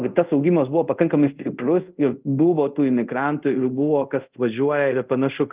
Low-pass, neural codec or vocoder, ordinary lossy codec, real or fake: 3.6 kHz; codec, 24 kHz, 0.5 kbps, DualCodec; Opus, 32 kbps; fake